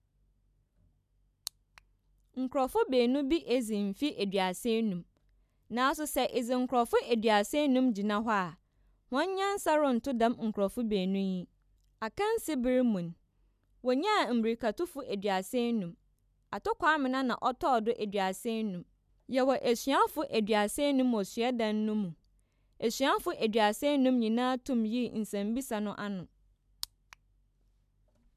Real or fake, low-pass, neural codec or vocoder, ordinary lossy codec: real; 14.4 kHz; none; none